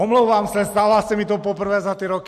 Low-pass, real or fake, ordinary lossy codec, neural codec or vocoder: 14.4 kHz; real; MP3, 64 kbps; none